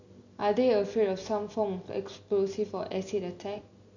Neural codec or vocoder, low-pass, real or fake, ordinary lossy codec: none; 7.2 kHz; real; none